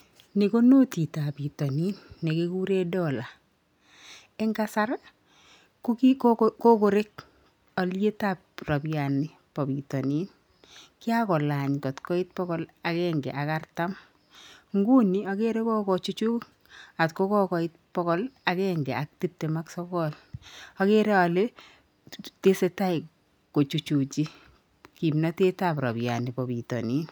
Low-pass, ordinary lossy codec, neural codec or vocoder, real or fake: none; none; none; real